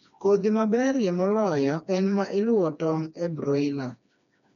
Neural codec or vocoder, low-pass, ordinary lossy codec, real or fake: codec, 16 kHz, 2 kbps, FreqCodec, smaller model; 7.2 kHz; none; fake